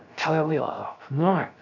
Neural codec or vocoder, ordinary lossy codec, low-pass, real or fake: codec, 16 kHz, 0.3 kbps, FocalCodec; none; 7.2 kHz; fake